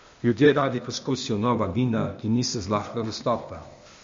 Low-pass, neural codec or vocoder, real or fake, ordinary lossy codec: 7.2 kHz; codec, 16 kHz, 0.8 kbps, ZipCodec; fake; MP3, 48 kbps